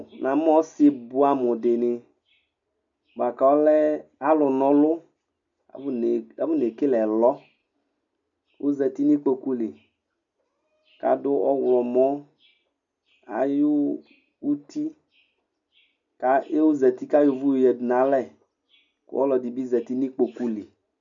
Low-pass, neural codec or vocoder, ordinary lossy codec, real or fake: 7.2 kHz; none; MP3, 96 kbps; real